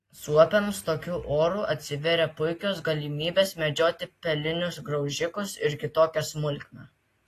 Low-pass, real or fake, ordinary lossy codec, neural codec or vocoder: 14.4 kHz; real; AAC, 48 kbps; none